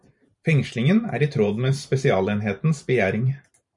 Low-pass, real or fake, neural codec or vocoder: 10.8 kHz; fake; vocoder, 44.1 kHz, 128 mel bands every 512 samples, BigVGAN v2